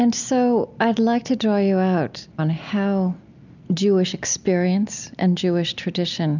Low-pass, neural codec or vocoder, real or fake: 7.2 kHz; none; real